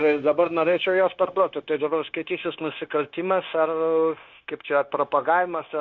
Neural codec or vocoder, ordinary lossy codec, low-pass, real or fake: codec, 16 kHz, 0.9 kbps, LongCat-Audio-Codec; MP3, 64 kbps; 7.2 kHz; fake